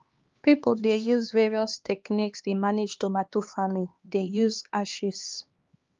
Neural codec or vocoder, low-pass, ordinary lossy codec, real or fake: codec, 16 kHz, 2 kbps, X-Codec, HuBERT features, trained on LibriSpeech; 7.2 kHz; Opus, 32 kbps; fake